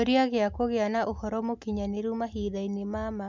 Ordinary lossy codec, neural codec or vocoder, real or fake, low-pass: MP3, 64 kbps; none; real; 7.2 kHz